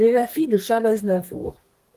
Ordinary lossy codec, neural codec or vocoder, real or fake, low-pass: Opus, 24 kbps; codec, 32 kHz, 1.9 kbps, SNAC; fake; 14.4 kHz